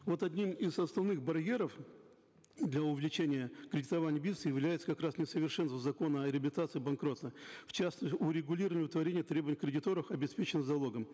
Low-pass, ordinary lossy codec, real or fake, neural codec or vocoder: none; none; real; none